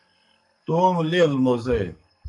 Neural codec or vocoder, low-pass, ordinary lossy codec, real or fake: codec, 44.1 kHz, 2.6 kbps, SNAC; 10.8 kHz; MP3, 64 kbps; fake